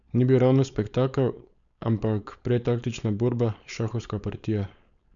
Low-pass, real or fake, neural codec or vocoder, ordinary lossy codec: 7.2 kHz; fake; codec, 16 kHz, 4.8 kbps, FACodec; none